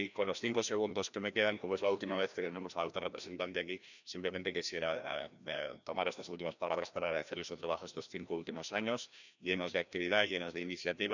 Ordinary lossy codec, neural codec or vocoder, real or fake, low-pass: none; codec, 16 kHz, 1 kbps, FreqCodec, larger model; fake; 7.2 kHz